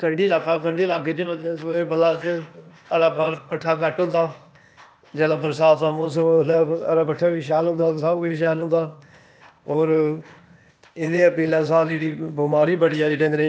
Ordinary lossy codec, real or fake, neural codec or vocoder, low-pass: none; fake; codec, 16 kHz, 0.8 kbps, ZipCodec; none